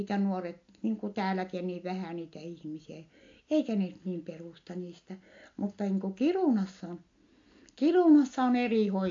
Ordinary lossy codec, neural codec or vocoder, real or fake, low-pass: AAC, 48 kbps; none; real; 7.2 kHz